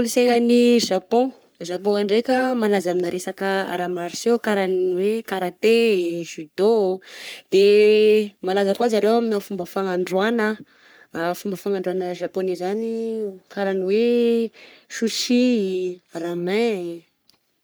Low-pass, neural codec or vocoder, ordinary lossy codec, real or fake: none; codec, 44.1 kHz, 3.4 kbps, Pupu-Codec; none; fake